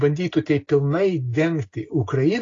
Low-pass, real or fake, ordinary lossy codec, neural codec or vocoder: 7.2 kHz; real; AAC, 32 kbps; none